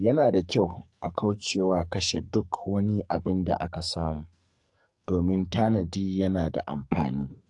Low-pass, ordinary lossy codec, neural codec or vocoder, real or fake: 10.8 kHz; AAC, 64 kbps; codec, 44.1 kHz, 2.6 kbps, SNAC; fake